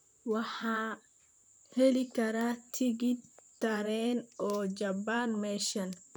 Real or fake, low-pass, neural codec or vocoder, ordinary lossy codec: fake; none; vocoder, 44.1 kHz, 128 mel bands, Pupu-Vocoder; none